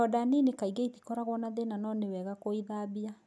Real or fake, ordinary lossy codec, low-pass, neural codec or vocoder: real; none; none; none